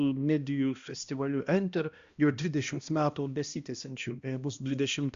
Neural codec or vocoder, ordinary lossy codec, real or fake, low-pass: codec, 16 kHz, 1 kbps, X-Codec, HuBERT features, trained on balanced general audio; Opus, 64 kbps; fake; 7.2 kHz